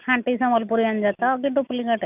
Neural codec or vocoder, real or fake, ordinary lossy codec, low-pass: none; real; none; 3.6 kHz